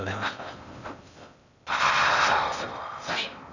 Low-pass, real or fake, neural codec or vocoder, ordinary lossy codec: 7.2 kHz; fake; codec, 16 kHz in and 24 kHz out, 0.6 kbps, FocalCodec, streaming, 4096 codes; none